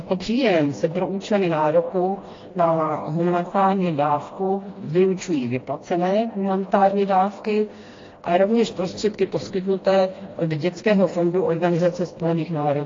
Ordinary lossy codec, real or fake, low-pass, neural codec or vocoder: AAC, 32 kbps; fake; 7.2 kHz; codec, 16 kHz, 1 kbps, FreqCodec, smaller model